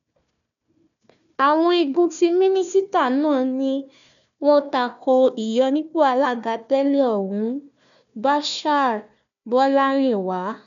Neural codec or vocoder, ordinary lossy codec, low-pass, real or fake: codec, 16 kHz, 1 kbps, FunCodec, trained on Chinese and English, 50 frames a second; none; 7.2 kHz; fake